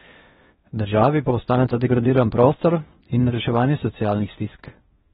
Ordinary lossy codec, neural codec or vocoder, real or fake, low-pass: AAC, 16 kbps; codec, 16 kHz in and 24 kHz out, 0.6 kbps, FocalCodec, streaming, 2048 codes; fake; 10.8 kHz